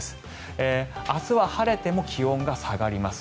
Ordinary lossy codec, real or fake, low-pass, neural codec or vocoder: none; real; none; none